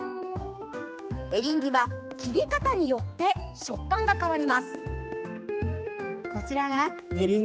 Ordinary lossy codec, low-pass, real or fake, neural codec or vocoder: none; none; fake; codec, 16 kHz, 2 kbps, X-Codec, HuBERT features, trained on general audio